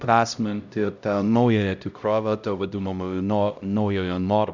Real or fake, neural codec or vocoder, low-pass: fake; codec, 16 kHz, 0.5 kbps, X-Codec, HuBERT features, trained on LibriSpeech; 7.2 kHz